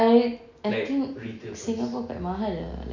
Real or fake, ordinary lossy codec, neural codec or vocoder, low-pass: real; none; none; 7.2 kHz